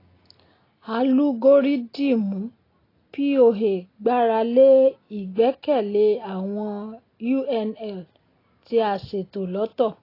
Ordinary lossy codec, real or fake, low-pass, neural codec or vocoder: AAC, 24 kbps; real; 5.4 kHz; none